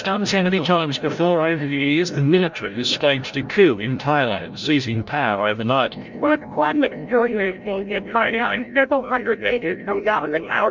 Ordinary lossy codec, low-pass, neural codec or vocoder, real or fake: MP3, 64 kbps; 7.2 kHz; codec, 16 kHz, 0.5 kbps, FreqCodec, larger model; fake